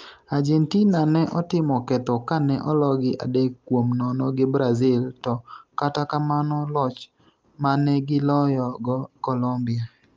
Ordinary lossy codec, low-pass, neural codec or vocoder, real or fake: Opus, 24 kbps; 7.2 kHz; none; real